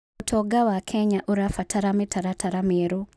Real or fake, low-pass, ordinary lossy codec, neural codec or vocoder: real; none; none; none